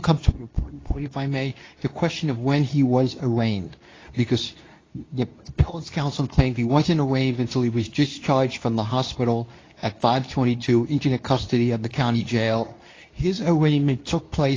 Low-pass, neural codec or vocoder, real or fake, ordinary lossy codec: 7.2 kHz; codec, 24 kHz, 0.9 kbps, WavTokenizer, medium speech release version 2; fake; AAC, 32 kbps